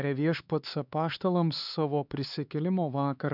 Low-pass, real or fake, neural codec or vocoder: 5.4 kHz; fake; codec, 16 kHz, 4 kbps, X-Codec, HuBERT features, trained on LibriSpeech